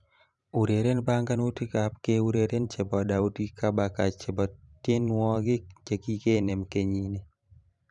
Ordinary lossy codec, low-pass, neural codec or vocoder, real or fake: none; 10.8 kHz; vocoder, 44.1 kHz, 128 mel bands every 512 samples, BigVGAN v2; fake